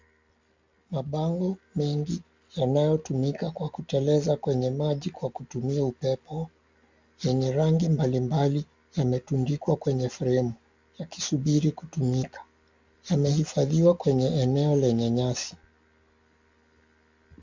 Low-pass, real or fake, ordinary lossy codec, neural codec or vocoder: 7.2 kHz; real; MP3, 64 kbps; none